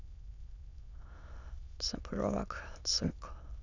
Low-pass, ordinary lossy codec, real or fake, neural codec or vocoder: 7.2 kHz; none; fake; autoencoder, 22.05 kHz, a latent of 192 numbers a frame, VITS, trained on many speakers